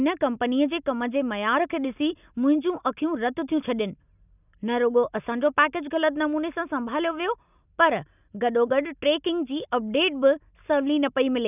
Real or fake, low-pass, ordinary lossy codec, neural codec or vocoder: real; 3.6 kHz; none; none